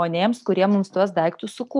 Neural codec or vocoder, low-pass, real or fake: none; 10.8 kHz; real